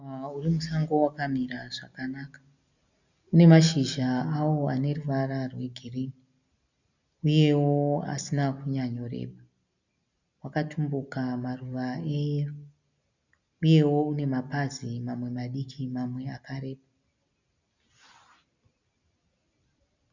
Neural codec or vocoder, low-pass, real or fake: none; 7.2 kHz; real